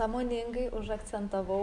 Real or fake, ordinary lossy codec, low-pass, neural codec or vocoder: real; AAC, 64 kbps; 10.8 kHz; none